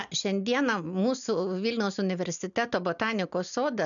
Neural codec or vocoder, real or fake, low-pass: none; real; 7.2 kHz